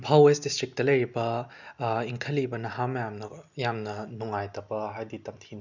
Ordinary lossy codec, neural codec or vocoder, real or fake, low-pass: none; none; real; 7.2 kHz